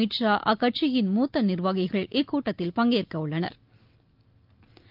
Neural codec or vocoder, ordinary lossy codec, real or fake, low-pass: none; Opus, 24 kbps; real; 5.4 kHz